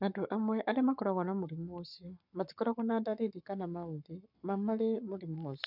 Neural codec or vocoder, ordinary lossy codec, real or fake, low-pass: codec, 44.1 kHz, 7.8 kbps, Pupu-Codec; none; fake; 5.4 kHz